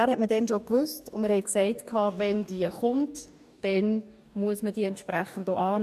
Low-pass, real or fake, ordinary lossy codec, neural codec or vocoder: 14.4 kHz; fake; none; codec, 44.1 kHz, 2.6 kbps, DAC